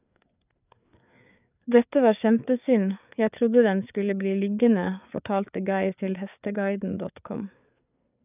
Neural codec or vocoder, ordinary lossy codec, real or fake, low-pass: codec, 16 kHz, 4 kbps, FreqCodec, larger model; none; fake; 3.6 kHz